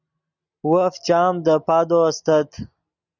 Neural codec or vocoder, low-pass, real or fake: none; 7.2 kHz; real